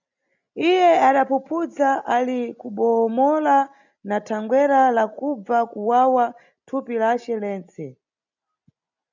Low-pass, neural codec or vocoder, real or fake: 7.2 kHz; none; real